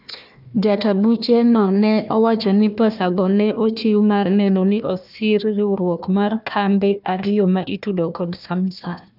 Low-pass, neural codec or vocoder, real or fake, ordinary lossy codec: 5.4 kHz; codec, 16 kHz, 1 kbps, FunCodec, trained on Chinese and English, 50 frames a second; fake; none